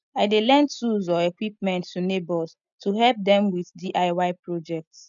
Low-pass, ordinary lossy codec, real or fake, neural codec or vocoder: 7.2 kHz; none; real; none